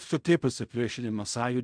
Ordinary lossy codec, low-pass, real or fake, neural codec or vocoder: MP3, 96 kbps; 9.9 kHz; fake; codec, 16 kHz in and 24 kHz out, 0.4 kbps, LongCat-Audio-Codec, fine tuned four codebook decoder